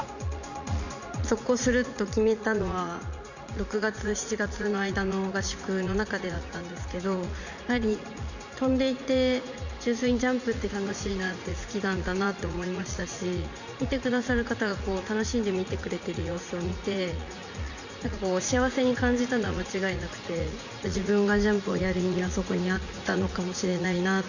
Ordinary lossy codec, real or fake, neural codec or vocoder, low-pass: none; fake; vocoder, 44.1 kHz, 80 mel bands, Vocos; 7.2 kHz